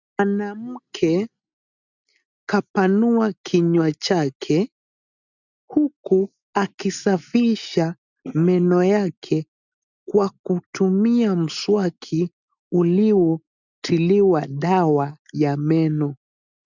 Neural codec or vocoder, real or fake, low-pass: none; real; 7.2 kHz